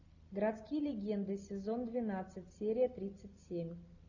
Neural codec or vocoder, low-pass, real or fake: none; 7.2 kHz; real